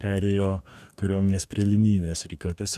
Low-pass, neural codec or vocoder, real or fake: 14.4 kHz; codec, 44.1 kHz, 2.6 kbps, DAC; fake